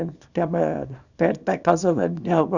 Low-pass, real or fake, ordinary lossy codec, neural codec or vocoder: 7.2 kHz; fake; none; codec, 24 kHz, 0.9 kbps, WavTokenizer, small release